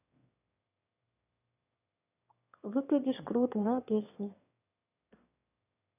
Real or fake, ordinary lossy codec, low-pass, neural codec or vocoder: fake; none; 3.6 kHz; autoencoder, 22.05 kHz, a latent of 192 numbers a frame, VITS, trained on one speaker